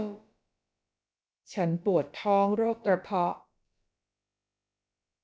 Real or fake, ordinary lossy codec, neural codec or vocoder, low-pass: fake; none; codec, 16 kHz, about 1 kbps, DyCAST, with the encoder's durations; none